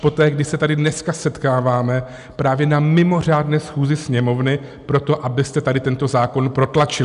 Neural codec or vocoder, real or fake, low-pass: none; real; 10.8 kHz